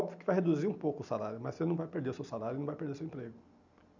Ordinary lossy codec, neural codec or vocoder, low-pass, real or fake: none; none; 7.2 kHz; real